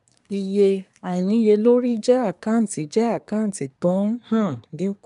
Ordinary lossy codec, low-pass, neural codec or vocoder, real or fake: none; 10.8 kHz; codec, 24 kHz, 1 kbps, SNAC; fake